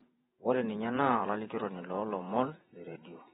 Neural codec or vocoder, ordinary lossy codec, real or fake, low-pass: vocoder, 48 kHz, 128 mel bands, Vocos; AAC, 16 kbps; fake; 19.8 kHz